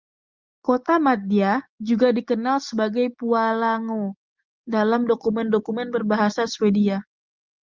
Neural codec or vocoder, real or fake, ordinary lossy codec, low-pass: none; real; Opus, 24 kbps; 7.2 kHz